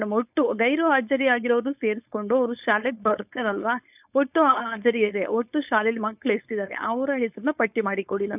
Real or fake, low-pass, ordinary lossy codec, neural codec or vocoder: fake; 3.6 kHz; none; codec, 16 kHz, 4.8 kbps, FACodec